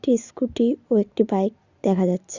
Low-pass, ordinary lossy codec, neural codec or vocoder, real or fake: 7.2 kHz; Opus, 64 kbps; none; real